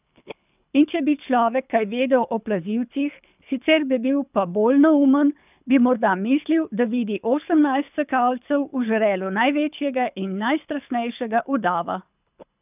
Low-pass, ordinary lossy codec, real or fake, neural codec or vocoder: 3.6 kHz; none; fake; codec, 24 kHz, 6 kbps, HILCodec